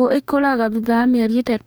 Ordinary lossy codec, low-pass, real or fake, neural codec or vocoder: none; none; fake; codec, 44.1 kHz, 2.6 kbps, DAC